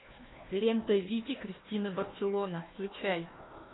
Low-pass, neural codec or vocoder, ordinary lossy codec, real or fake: 7.2 kHz; codec, 16 kHz, 1 kbps, FunCodec, trained on Chinese and English, 50 frames a second; AAC, 16 kbps; fake